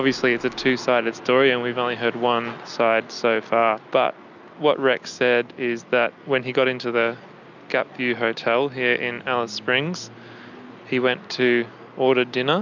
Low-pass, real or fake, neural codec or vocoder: 7.2 kHz; real; none